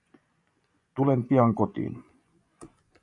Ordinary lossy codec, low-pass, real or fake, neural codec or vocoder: AAC, 64 kbps; 10.8 kHz; fake; vocoder, 24 kHz, 100 mel bands, Vocos